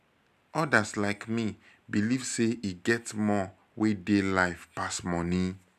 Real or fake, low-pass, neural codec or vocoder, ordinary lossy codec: real; 14.4 kHz; none; none